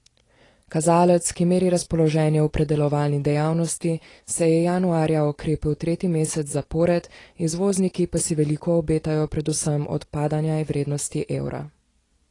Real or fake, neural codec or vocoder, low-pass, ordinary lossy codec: real; none; 10.8 kHz; AAC, 32 kbps